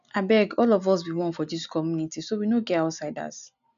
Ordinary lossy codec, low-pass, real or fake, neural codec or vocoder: none; 7.2 kHz; real; none